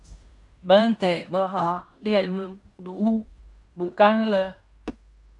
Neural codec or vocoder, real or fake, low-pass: codec, 16 kHz in and 24 kHz out, 0.9 kbps, LongCat-Audio-Codec, fine tuned four codebook decoder; fake; 10.8 kHz